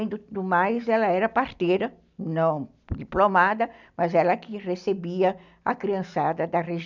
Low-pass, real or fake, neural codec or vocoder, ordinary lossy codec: 7.2 kHz; real; none; none